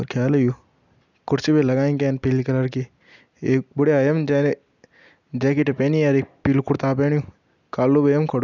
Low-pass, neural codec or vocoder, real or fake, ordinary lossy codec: 7.2 kHz; none; real; none